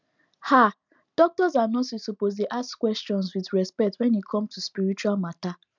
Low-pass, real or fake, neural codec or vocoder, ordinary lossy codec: 7.2 kHz; real; none; none